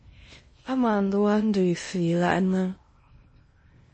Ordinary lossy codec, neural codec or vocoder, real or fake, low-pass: MP3, 32 kbps; codec, 16 kHz in and 24 kHz out, 0.6 kbps, FocalCodec, streaming, 2048 codes; fake; 9.9 kHz